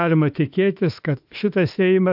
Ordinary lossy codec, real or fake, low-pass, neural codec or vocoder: AAC, 48 kbps; fake; 5.4 kHz; autoencoder, 48 kHz, 32 numbers a frame, DAC-VAE, trained on Japanese speech